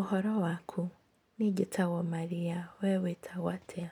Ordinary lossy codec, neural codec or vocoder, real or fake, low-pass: none; none; real; 19.8 kHz